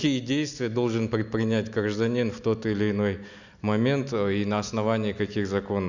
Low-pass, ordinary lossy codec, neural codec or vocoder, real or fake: 7.2 kHz; none; none; real